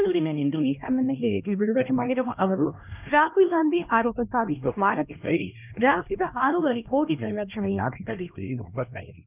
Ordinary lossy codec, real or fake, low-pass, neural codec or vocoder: none; fake; 3.6 kHz; codec, 16 kHz, 1 kbps, X-Codec, HuBERT features, trained on LibriSpeech